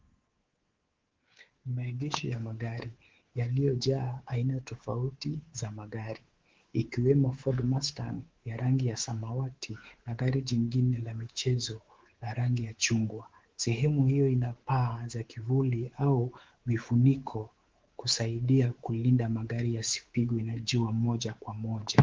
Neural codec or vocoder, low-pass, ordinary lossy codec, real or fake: codec, 24 kHz, 3.1 kbps, DualCodec; 7.2 kHz; Opus, 16 kbps; fake